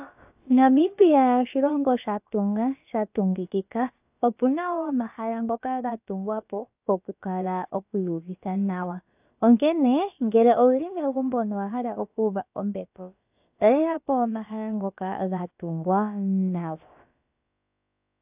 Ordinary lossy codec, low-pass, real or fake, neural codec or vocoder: AAC, 32 kbps; 3.6 kHz; fake; codec, 16 kHz, about 1 kbps, DyCAST, with the encoder's durations